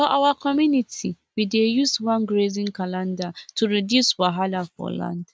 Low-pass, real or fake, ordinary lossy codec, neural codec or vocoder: none; real; none; none